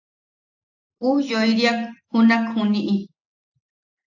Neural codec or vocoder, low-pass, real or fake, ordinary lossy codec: none; 7.2 kHz; real; AAC, 48 kbps